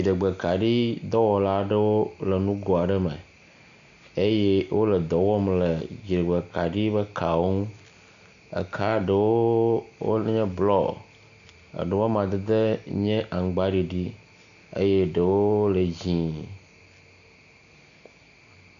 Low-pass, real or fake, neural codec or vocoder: 7.2 kHz; real; none